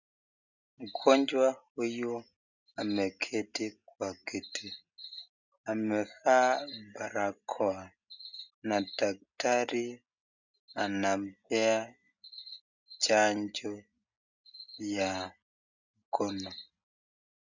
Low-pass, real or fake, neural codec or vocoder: 7.2 kHz; real; none